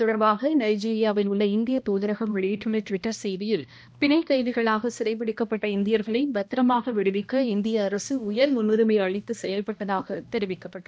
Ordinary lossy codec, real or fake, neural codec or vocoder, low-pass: none; fake; codec, 16 kHz, 1 kbps, X-Codec, HuBERT features, trained on balanced general audio; none